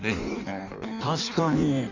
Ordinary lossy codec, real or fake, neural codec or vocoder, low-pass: none; fake; codec, 16 kHz in and 24 kHz out, 1.1 kbps, FireRedTTS-2 codec; 7.2 kHz